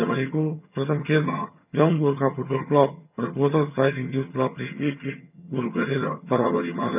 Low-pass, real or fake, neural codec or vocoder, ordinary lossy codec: 3.6 kHz; fake; vocoder, 22.05 kHz, 80 mel bands, HiFi-GAN; none